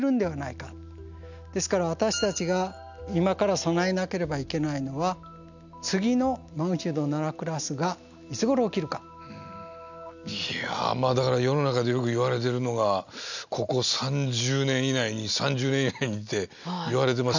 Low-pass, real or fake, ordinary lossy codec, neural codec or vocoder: 7.2 kHz; real; none; none